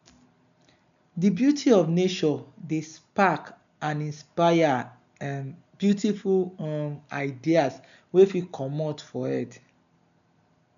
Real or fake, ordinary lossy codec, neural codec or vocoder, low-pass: real; none; none; 7.2 kHz